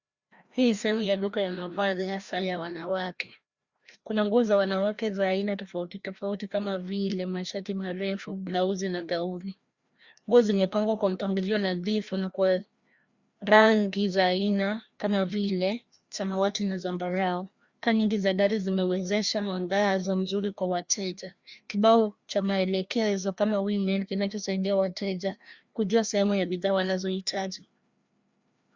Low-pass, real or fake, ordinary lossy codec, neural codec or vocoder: 7.2 kHz; fake; Opus, 64 kbps; codec, 16 kHz, 1 kbps, FreqCodec, larger model